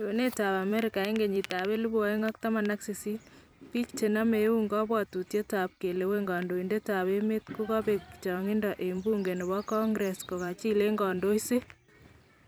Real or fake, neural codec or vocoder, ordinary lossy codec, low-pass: fake; vocoder, 44.1 kHz, 128 mel bands every 256 samples, BigVGAN v2; none; none